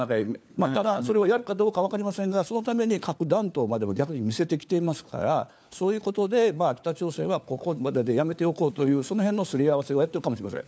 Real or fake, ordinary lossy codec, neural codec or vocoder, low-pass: fake; none; codec, 16 kHz, 4 kbps, FunCodec, trained on LibriTTS, 50 frames a second; none